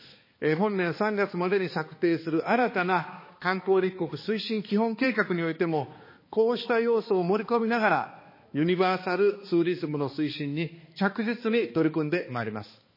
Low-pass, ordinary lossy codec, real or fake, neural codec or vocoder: 5.4 kHz; MP3, 24 kbps; fake; codec, 16 kHz, 2 kbps, X-Codec, HuBERT features, trained on balanced general audio